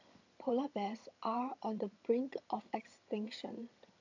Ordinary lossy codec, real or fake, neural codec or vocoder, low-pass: AAC, 48 kbps; fake; vocoder, 22.05 kHz, 80 mel bands, HiFi-GAN; 7.2 kHz